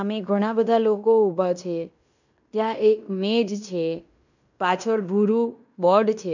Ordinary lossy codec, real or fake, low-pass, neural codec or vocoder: none; fake; 7.2 kHz; codec, 16 kHz in and 24 kHz out, 0.9 kbps, LongCat-Audio-Codec, fine tuned four codebook decoder